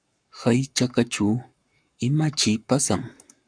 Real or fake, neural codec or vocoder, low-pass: fake; codec, 44.1 kHz, 7.8 kbps, Pupu-Codec; 9.9 kHz